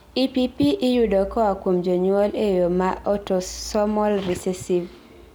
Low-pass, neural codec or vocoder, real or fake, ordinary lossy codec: none; none; real; none